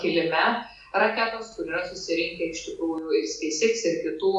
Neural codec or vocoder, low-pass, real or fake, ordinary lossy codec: none; 10.8 kHz; real; MP3, 96 kbps